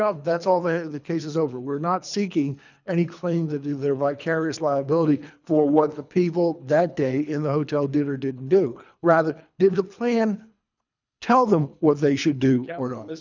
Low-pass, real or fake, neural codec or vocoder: 7.2 kHz; fake; codec, 24 kHz, 3 kbps, HILCodec